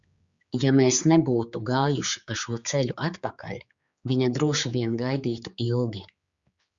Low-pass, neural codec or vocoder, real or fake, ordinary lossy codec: 7.2 kHz; codec, 16 kHz, 4 kbps, X-Codec, HuBERT features, trained on general audio; fake; Opus, 64 kbps